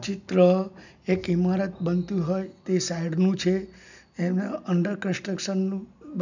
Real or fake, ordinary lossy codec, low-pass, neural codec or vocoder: real; none; 7.2 kHz; none